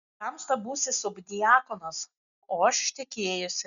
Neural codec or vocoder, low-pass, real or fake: none; 7.2 kHz; real